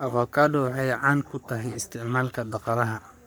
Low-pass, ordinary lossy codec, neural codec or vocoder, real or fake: none; none; codec, 44.1 kHz, 3.4 kbps, Pupu-Codec; fake